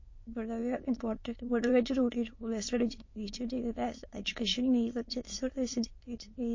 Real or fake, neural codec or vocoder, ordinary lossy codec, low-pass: fake; autoencoder, 22.05 kHz, a latent of 192 numbers a frame, VITS, trained on many speakers; MP3, 32 kbps; 7.2 kHz